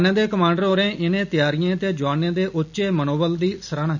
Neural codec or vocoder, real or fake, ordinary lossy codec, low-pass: none; real; none; 7.2 kHz